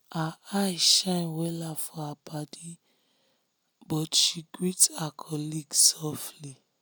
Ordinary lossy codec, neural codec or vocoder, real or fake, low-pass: none; none; real; none